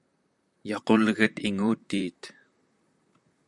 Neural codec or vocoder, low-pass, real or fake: vocoder, 44.1 kHz, 128 mel bands, Pupu-Vocoder; 10.8 kHz; fake